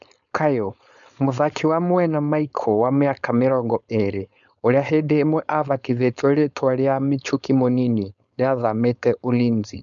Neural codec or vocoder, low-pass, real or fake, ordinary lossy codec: codec, 16 kHz, 4.8 kbps, FACodec; 7.2 kHz; fake; none